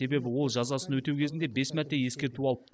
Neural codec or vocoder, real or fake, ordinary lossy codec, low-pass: none; real; none; none